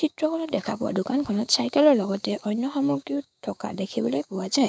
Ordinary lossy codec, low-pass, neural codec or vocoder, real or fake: none; none; codec, 16 kHz, 6 kbps, DAC; fake